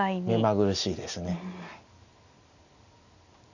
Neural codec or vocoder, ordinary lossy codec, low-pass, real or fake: none; none; 7.2 kHz; real